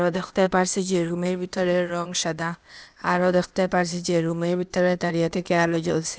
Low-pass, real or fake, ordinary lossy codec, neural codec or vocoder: none; fake; none; codec, 16 kHz, 0.8 kbps, ZipCodec